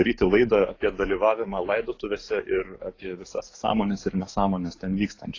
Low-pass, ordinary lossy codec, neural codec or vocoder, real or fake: 7.2 kHz; AAC, 32 kbps; codec, 44.1 kHz, 7.8 kbps, DAC; fake